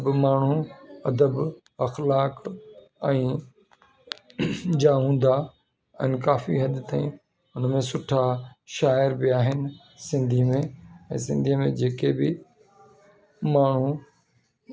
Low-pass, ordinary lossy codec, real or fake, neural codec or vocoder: none; none; real; none